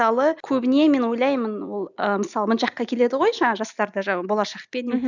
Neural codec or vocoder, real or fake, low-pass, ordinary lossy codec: none; real; 7.2 kHz; none